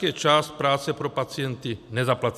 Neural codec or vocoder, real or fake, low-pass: none; real; 14.4 kHz